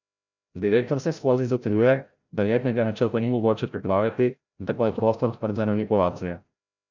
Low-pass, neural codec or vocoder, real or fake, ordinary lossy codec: 7.2 kHz; codec, 16 kHz, 0.5 kbps, FreqCodec, larger model; fake; none